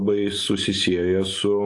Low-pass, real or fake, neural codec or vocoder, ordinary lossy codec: 10.8 kHz; real; none; AAC, 48 kbps